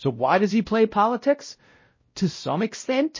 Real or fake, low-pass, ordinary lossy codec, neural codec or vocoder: fake; 7.2 kHz; MP3, 32 kbps; codec, 16 kHz, 0.5 kbps, X-Codec, WavLM features, trained on Multilingual LibriSpeech